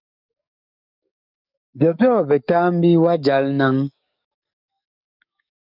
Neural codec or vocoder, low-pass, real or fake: codec, 44.1 kHz, 7.8 kbps, DAC; 5.4 kHz; fake